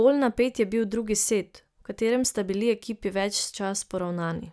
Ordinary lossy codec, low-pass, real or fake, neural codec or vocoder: none; none; real; none